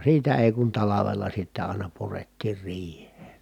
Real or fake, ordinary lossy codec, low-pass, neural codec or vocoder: real; none; 19.8 kHz; none